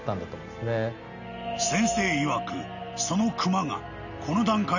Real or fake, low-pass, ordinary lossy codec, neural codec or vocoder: real; 7.2 kHz; none; none